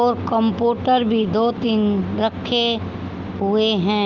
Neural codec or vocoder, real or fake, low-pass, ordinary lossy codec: none; real; 7.2 kHz; Opus, 32 kbps